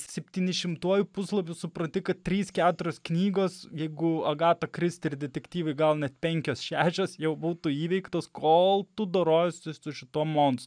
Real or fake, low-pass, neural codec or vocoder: real; 9.9 kHz; none